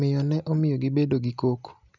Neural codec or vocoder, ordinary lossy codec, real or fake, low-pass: none; none; real; 7.2 kHz